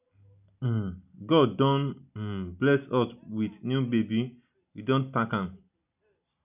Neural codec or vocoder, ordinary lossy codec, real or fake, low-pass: none; none; real; 3.6 kHz